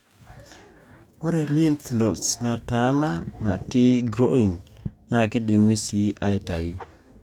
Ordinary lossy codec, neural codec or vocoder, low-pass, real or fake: none; codec, 44.1 kHz, 2.6 kbps, DAC; 19.8 kHz; fake